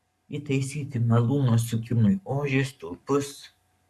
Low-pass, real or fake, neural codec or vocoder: 14.4 kHz; fake; codec, 44.1 kHz, 7.8 kbps, Pupu-Codec